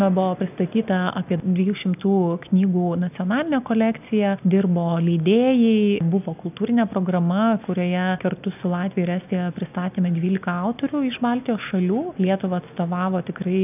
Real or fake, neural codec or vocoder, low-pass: real; none; 3.6 kHz